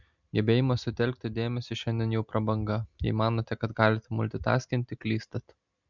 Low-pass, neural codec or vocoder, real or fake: 7.2 kHz; none; real